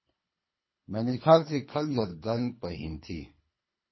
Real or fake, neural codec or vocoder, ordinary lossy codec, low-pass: fake; codec, 24 kHz, 3 kbps, HILCodec; MP3, 24 kbps; 7.2 kHz